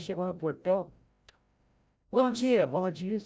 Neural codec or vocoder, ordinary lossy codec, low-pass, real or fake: codec, 16 kHz, 0.5 kbps, FreqCodec, larger model; none; none; fake